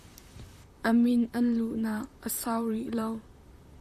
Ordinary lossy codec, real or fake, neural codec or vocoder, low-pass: MP3, 96 kbps; fake; vocoder, 44.1 kHz, 128 mel bands, Pupu-Vocoder; 14.4 kHz